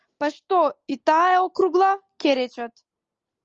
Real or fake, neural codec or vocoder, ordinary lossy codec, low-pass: real; none; Opus, 24 kbps; 7.2 kHz